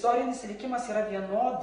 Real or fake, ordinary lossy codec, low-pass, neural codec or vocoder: real; AAC, 32 kbps; 9.9 kHz; none